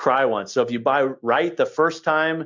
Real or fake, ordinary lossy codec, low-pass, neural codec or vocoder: real; MP3, 64 kbps; 7.2 kHz; none